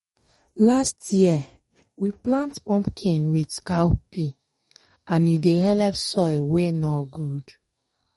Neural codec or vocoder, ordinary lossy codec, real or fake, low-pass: codec, 44.1 kHz, 2.6 kbps, DAC; MP3, 48 kbps; fake; 19.8 kHz